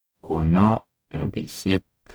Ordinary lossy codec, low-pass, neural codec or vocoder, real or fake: none; none; codec, 44.1 kHz, 0.9 kbps, DAC; fake